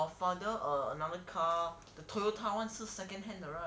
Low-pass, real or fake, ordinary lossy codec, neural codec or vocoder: none; real; none; none